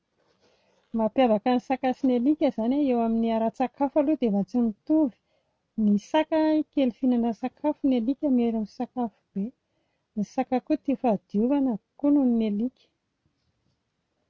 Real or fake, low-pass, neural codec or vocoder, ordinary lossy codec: real; none; none; none